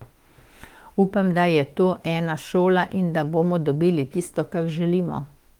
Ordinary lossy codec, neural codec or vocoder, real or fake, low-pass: Opus, 24 kbps; autoencoder, 48 kHz, 32 numbers a frame, DAC-VAE, trained on Japanese speech; fake; 19.8 kHz